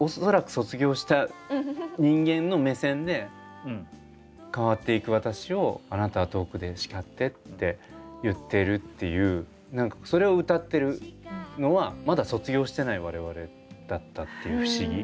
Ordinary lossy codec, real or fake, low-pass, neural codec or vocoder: none; real; none; none